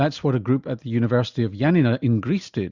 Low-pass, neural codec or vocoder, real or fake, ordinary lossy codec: 7.2 kHz; none; real; Opus, 64 kbps